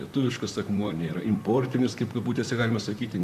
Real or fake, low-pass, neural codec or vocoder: fake; 14.4 kHz; vocoder, 44.1 kHz, 128 mel bands, Pupu-Vocoder